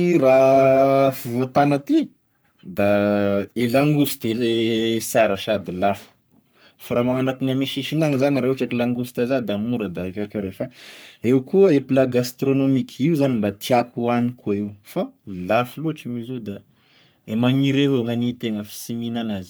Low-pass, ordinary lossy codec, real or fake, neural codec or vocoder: none; none; fake; codec, 44.1 kHz, 3.4 kbps, Pupu-Codec